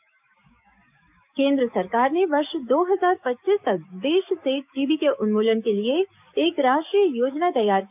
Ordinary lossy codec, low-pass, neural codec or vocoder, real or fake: none; 3.6 kHz; codec, 16 kHz, 6 kbps, DAC; fake